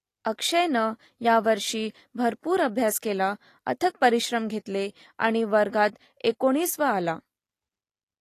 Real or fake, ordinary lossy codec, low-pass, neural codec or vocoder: real; AAC, 48 kbps; 14.4 kHz; none